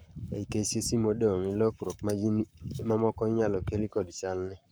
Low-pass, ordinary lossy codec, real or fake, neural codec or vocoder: none; none; fake; codec, 44.1 kHz, 7.8 kbps, Pupu-Codec